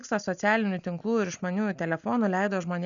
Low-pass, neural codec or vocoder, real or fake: 7.2 kHz; none; real